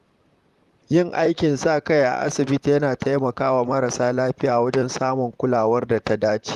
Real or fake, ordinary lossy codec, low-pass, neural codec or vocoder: fake; Opus, 32 kbps; 14.4 kHz; vocoder, 44.1 kHz, 128 mel bands, Pupu-Vocoder